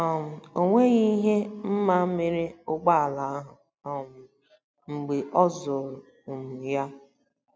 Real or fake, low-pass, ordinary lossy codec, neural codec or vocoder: real; none; none; none